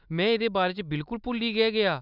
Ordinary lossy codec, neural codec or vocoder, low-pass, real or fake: none; none; 5.4 kHz; real